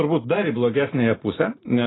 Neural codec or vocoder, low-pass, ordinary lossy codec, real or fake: none; 7.2 kHz; AAC, 16 kbps; real